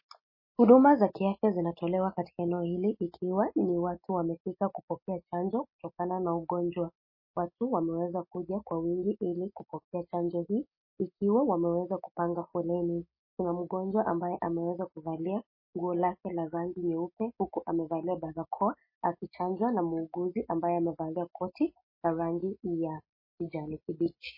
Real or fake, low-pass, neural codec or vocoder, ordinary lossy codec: real; 5.4 kHz; none; MP3, 24 kbps